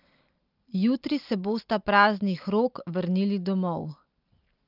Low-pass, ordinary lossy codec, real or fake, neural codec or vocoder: 5.4 kHz; Opus, 24 kbps; real; none